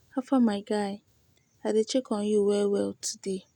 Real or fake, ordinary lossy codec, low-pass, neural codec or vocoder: real; none; 19.8 kHz; none